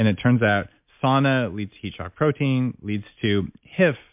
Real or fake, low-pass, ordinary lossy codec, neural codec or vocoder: real; 3.6 kHz; MP3, 32 kbps; none